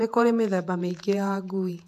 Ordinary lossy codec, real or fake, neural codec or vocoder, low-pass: MP3, 96 kbps; fake; vocoder, 48 kHz, 128 mel bands, Vocos; 14.4 kHz